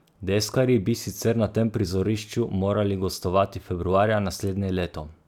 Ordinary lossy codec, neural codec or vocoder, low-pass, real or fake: none; none; 19.8 kHz; real